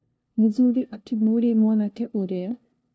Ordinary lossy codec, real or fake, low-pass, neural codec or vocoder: none; fake; none; codec, 16 kHz, 0.5 kbps, FunCodec, trained on LibriTTS, 25 frames a second